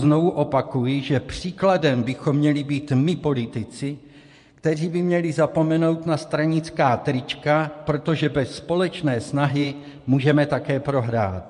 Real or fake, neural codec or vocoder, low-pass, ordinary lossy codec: fake; vocoder, 24 kHz, 100 mel bands, Vocos; 10.8 kHz; MP3, 64 kbps